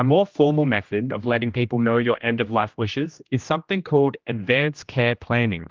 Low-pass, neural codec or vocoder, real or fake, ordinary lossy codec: 7.2 kHz; codec, 16 kHz, 1 kbps, X-Codec, HuBERT features, trained on general audio; fake; Opus, 32 kbps